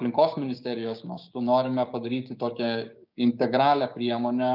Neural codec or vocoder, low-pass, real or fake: codec, 16 kHz, 6 kbps, DAC; 5.4 kHz; fake